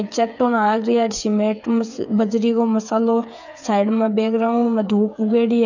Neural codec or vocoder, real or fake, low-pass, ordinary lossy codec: codec, 16 kHz, 8 kbps, FreqCodec, smaller model; fake; 7.2 kHz; none